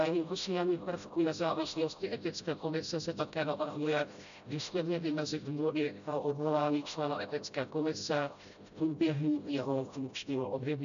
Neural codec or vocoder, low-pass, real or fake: codec, 16 kHz, 0.5 kbps, FreqCodec, smaller model; 7.2 kHz; fake